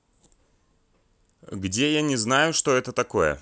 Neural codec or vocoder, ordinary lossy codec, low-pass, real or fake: none; none; none; real